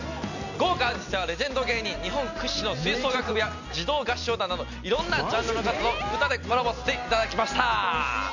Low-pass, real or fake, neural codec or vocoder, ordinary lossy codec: 7.2 kHz; real; none; none